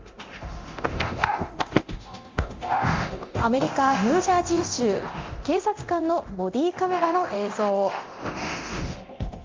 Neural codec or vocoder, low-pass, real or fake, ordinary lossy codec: codec, 24 kHz, 0.9 kbps, DualCodec; 7.2 kHz; fake; Opus, 32 kbps